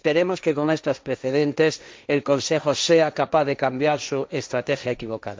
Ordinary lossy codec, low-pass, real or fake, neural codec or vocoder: none; none; fake; codec, 16 kHz, 1.1 kbps, Voila-Tokenizer